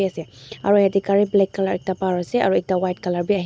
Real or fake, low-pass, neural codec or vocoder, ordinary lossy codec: real; none; none; none